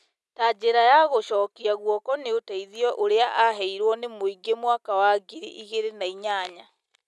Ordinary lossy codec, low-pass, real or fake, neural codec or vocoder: none; none; real; none